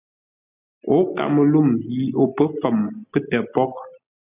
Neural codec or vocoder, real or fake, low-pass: none; real; 3.6 kHz